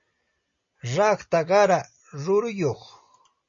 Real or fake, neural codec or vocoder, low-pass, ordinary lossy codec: real; none; 7.2 kHz; MP3, 32 kbps